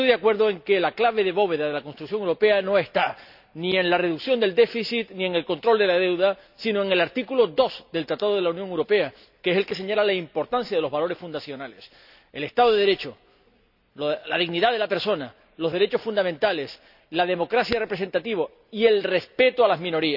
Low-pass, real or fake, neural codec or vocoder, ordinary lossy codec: 5.4 kHz; real; none; none